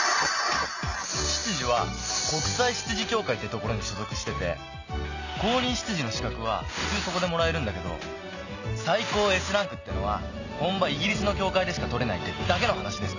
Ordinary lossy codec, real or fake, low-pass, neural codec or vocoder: none; real; 7.2 kHz; none